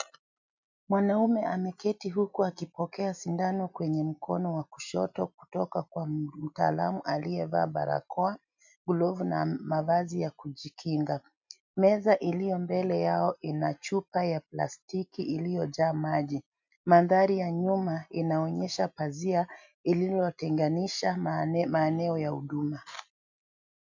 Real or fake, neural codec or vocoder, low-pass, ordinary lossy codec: real; none; 7.2 kHz; MP3, 64 kbps